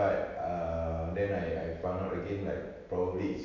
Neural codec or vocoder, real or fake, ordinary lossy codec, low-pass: none; real; AAC, 48 kbps; 7.2 kHz